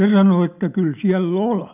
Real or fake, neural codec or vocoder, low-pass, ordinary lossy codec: real; none; 3.6 kHz; none